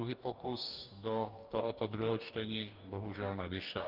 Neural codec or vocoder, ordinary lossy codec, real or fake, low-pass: codec, 44.1 kHz, 2.6 kbps, DAC; Opus, 32 kbps; fake; 5.4 kHz